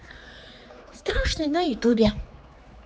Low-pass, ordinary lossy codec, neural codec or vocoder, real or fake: none; none; codec, 16 kHz, 4 kbps, X-Codec, HuBERT features, trained on general audio; fake